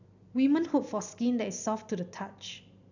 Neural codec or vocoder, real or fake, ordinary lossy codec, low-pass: none; real; none; 7.2 kHz